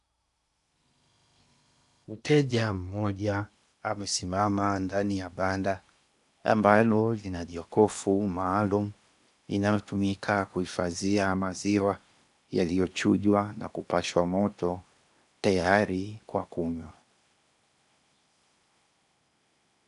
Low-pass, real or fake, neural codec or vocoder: 10.8 kHz; fake; codec, 16 kHz in and 24 kHz out, 0.8 kbps, FocalCodec, streaming, 65536 codes